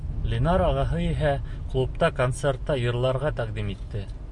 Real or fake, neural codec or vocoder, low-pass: real; none; 10.8 kHz